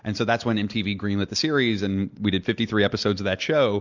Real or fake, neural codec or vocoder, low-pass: real; none; 7.2 kHz